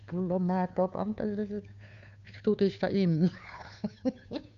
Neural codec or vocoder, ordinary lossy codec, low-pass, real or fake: codec, 16 kHz, 2 kbps, FunCodec, trained on Chinese and English, 25 frames a second; MP3, 96 kbps; 7.2 kHz; fake